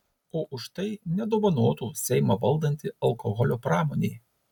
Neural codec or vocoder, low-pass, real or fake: none; 19.8 kHz; real